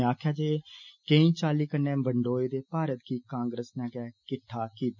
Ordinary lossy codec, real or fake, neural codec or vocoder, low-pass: none; real; none; 7.2 kHz